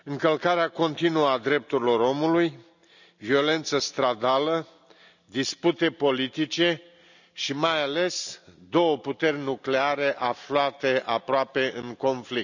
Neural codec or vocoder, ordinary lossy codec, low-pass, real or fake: none; none; 7.2 kHz; real